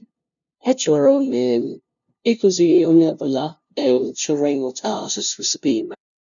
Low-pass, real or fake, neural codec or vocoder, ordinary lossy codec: 7.2 kHz; fake; codec, 16 kHz, 0.5 kbps, FunCodec, trained on LibriTTS, 25 frames a second; none